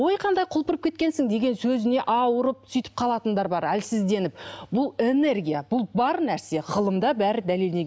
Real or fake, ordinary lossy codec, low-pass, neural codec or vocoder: real; none; none; none